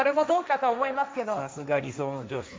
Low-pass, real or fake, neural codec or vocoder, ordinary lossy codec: none; fake; codec, 16 kHz, 1.1 kbps, Voila-Tokenizer; none